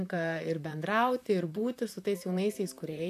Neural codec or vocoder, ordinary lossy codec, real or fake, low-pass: vocoder, 44.1 kHz, 128 mel bands, Pupu-Vocoder; AAC, 96 kbps; fake; 14.4 kHz